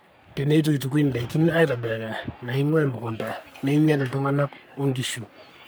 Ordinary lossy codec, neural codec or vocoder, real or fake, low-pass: none; codec, 44.1 kHz, 3.4 kbps, Pupu-Codec; fake; none